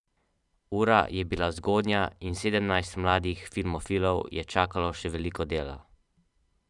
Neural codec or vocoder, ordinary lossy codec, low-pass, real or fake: vocoder, 44.1 kHz, 128 mel bands every 512 samples, BigVGAN v2; none; 10.8 kHz; fake